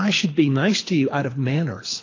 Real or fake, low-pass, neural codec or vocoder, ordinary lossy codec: fake; 7.2 kHz; codec, 24 kHz, 6 kbps, HILCodec; AAC, 32 kbps